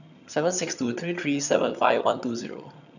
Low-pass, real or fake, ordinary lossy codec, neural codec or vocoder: 7.2 kHz; fake; none; vocoder, 22.05 kHz, 80 mel bands, HiFi-GAN